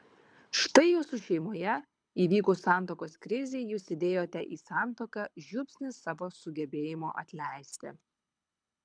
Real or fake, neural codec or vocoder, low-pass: fake; codec, 24 kHz, 6 kbps, HILCodec; 9.9 kHz